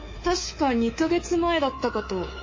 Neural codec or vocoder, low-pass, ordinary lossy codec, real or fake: codec, 24 kHz, 3.1 kbps, DualCodec; 7.2 kHz; MP3, 32 kbps; fake